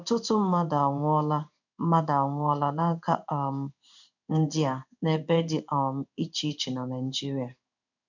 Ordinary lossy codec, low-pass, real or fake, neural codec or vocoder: none; 7.2 kHz; fake; codec, 16 kHz in and 24 kHz out, 1 kbps, XY-Tokenizer